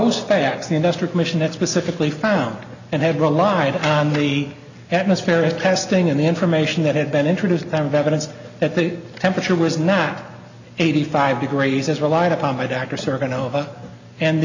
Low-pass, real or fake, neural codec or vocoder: 7.2 kHz; real; none